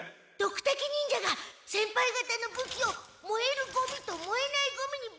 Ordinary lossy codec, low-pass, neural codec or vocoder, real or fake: none; none; none; real